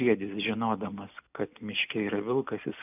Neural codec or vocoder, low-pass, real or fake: none; 3.6 kHz; real